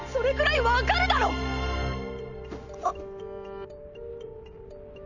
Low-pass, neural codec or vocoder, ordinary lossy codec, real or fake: 7.2 kHz; none; none; real